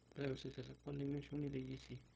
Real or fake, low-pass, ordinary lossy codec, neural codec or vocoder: fake; none; none; codec, 16 kHz, 0.4 kbps, LongCat-Audio-Codec